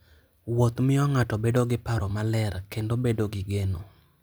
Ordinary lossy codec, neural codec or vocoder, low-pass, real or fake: none; none; none; real